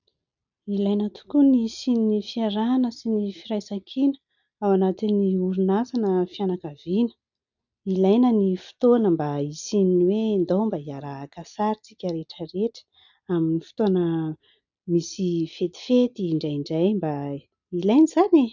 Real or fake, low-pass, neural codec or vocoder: real; 7.2 kHz; none